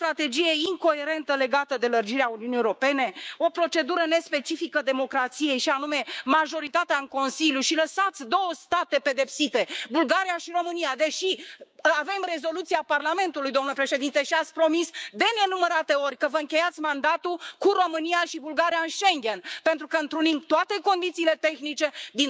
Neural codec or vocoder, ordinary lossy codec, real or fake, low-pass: codec, 16 kHz, 6 kbps, DAC; none; fake; none